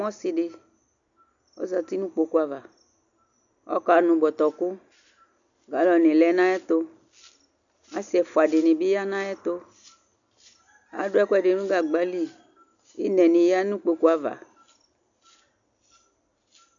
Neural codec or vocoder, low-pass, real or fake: none; 7.2 kHz; real